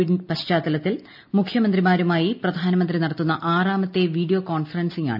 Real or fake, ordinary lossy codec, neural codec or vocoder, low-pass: real; none; none; 5.4 kHz